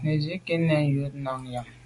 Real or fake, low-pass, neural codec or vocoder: real; 10.8 kHz; none